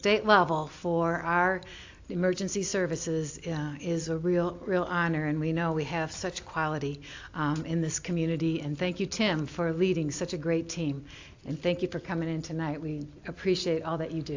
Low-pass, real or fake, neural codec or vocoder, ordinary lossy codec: 7.2 kHz; real; none; AAC, 48 kbps